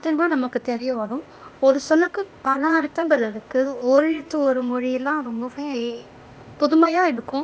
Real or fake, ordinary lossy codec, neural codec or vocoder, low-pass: fake; none; codec, 16 kHz, 0.8 kbps, ZipCodec; none